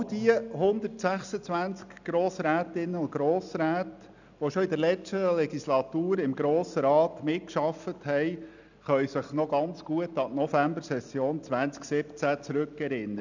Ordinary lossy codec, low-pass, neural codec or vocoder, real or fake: none; 7.2 kHz; none; real